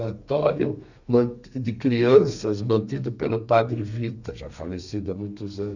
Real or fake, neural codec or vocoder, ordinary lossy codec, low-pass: fake; codec, 32 kHz, 1.9 kbps, SNAC; none; 7.2 kHz